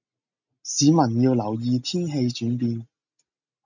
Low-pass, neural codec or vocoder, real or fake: 7.2 kHz; none; real